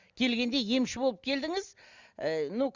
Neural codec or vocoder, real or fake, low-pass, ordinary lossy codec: none; real; 7.2 kHz; Opus, 64 kbps